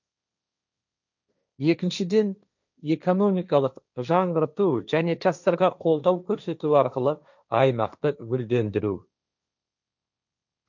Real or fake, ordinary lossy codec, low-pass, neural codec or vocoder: fake; none; 7.2 kHz; codec, 16 kHz, 1.1 kbps, Voila-Tokenizer